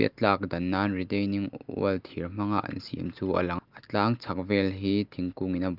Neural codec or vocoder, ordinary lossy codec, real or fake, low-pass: none; Opus, 32 kbps; real; 5.4 kHz